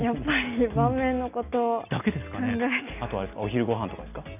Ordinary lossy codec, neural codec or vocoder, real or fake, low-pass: none; none; real; 3.6 kHz